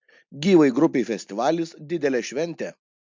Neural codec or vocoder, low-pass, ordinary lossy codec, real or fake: none; 7.2 kHz; MP3, 64 kbps; real